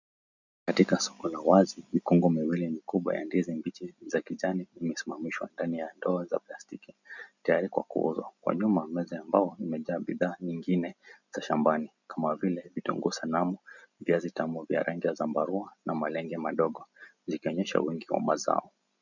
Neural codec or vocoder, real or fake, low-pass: none; real; 7.2 kHz